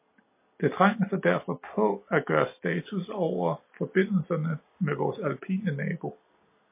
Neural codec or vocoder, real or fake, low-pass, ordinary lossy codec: none; real; 3.6 kHz; MP3, 24 kbps